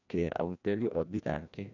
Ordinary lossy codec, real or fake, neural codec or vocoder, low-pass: none; fake; codec, 16 kHz, 1 kbps, FreqCodec, larger model; 7.2 kHz